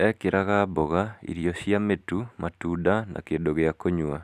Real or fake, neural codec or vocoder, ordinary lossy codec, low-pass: real; none; none; 14.4 kHz